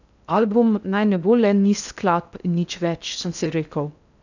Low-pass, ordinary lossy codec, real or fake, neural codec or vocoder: 7.2 kHz; none; fake; codec, 16 kHz in and 24 kHz out, 0.6 kbps, FocalCodec, streaming, 2048 codes